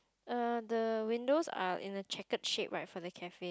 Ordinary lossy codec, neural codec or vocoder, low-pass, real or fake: none; none; none; real